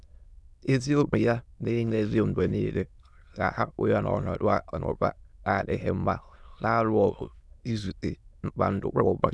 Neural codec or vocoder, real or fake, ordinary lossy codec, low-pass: autoencoder, 22.05 kHz, a latent of 192 numbers a frame, VITS, trained on many speakers; fake; none; none